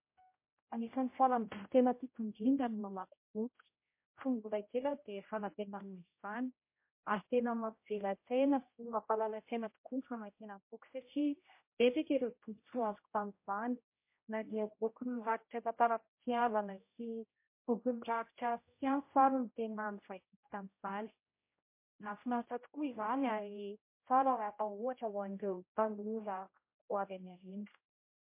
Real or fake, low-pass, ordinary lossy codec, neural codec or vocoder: fake; 3.6 kHz; MP3, 24 kbps; codec, 16 kHz, 0.5 kbps, X-Codec, HuBERT features, trained on general audio